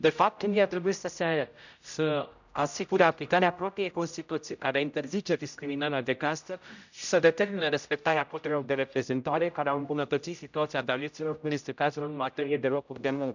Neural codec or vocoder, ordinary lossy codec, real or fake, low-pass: codec, 16 kHz, 0.5 kbps, X-Codec, HuBERT features, trained on general audio; none; fake; 7.2 kHz